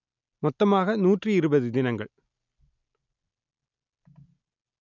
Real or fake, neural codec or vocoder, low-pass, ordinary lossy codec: real; none; 7.2 kHz; none